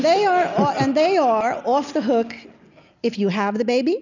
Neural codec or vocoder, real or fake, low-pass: none; real; 7.2 kHz